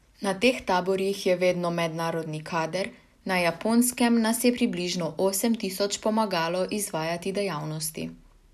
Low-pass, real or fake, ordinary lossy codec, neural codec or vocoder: 14.4 kHz; real; none; none